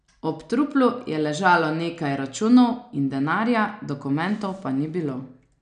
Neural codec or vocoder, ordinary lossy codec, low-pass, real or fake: none; none; 9.9 kHz; real